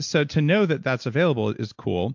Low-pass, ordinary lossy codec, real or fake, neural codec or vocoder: 7.2 kHz; MP3, 48 kbps; real; none